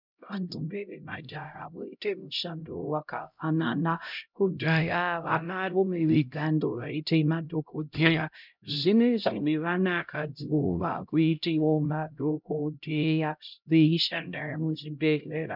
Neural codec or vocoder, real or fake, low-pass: codec, 16 kHz, 0.5 kbps, X-Codec, HuBERT features, trained on LibriSpeech; fake; 5.4 kHz